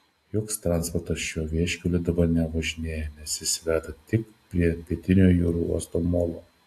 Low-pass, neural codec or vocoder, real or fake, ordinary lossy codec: 14.4 kHz; none; real; AAC, 64 kbps